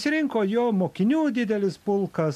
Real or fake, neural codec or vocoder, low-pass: real; none; 14.4 kHz